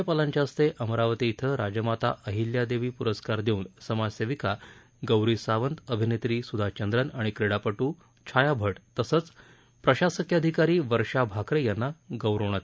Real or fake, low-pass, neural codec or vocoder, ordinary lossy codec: real; none; none; none